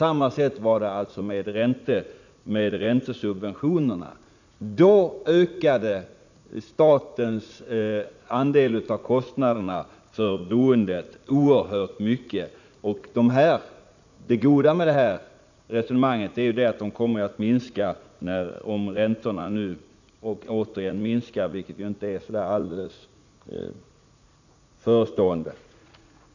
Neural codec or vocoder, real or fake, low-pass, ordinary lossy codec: vocoder, 44.1 kHz, 80 mel bands, Vocos; fake; 7.2 kHz; none